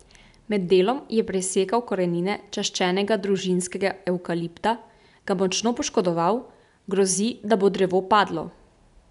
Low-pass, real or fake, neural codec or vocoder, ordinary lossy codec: 10.8 kHz; real; none; none